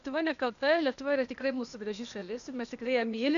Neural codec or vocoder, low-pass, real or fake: codec, 16 kHz, 0.8 kbps, ZipCodec; 7.2 kHz; fake